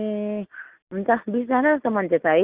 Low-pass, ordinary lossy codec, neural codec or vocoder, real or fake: 3.6 kHz; Opus, 24 kbps; none; real